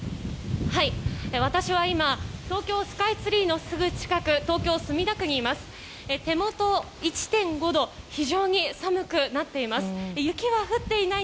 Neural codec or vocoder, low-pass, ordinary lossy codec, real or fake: none; none; none; real